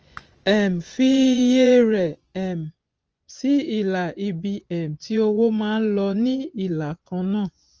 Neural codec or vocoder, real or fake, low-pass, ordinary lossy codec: vocoder, 24 kHz, 100 mel bands, Vocos; fake; 7.2 kHz; Opus, 24 kbps